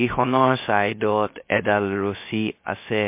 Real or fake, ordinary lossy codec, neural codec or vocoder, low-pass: fake; MP3, 24 kbps; codec, 16 kHz, 0.3 kbps, FocalCodec; 3.6 kHz